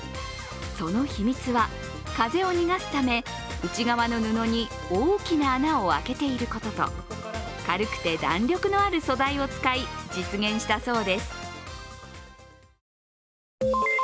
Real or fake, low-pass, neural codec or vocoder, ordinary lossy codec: real; none; none; none